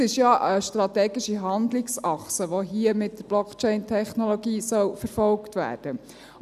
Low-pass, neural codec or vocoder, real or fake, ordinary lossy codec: 14.4 kHz; none; real; none